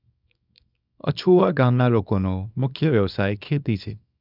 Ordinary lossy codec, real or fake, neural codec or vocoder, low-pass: none; fake; codec, 24 kHz, 0.9 kbps, WavTokenizer, small release; 5.4 kHz